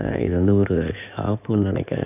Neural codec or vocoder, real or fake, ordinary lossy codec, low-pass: codec, 16 kHz in and 24 kHz out, 2.2 kbps, FireRedTTS-2 codec; fake; none; 3.6 kHz